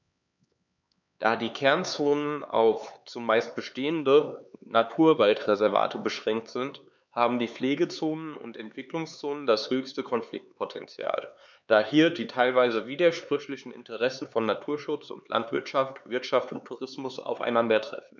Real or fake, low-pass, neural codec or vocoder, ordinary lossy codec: fake; 7.2 kHz; codec, 16 kHz, 4 kbps, X-Codec, HuBERT features, trained on LibriSpeech; none